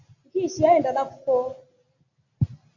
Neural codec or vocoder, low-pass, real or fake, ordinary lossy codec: none; 7.2 kHz; real; AAC, 48 kbps